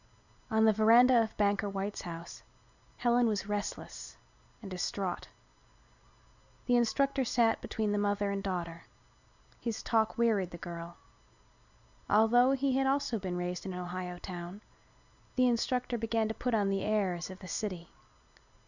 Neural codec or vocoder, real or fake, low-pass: none; real; 7.2 kHz